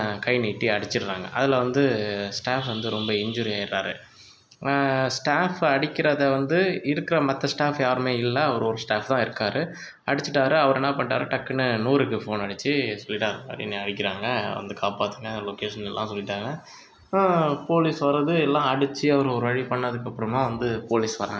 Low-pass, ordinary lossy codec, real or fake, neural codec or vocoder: none; none; real; none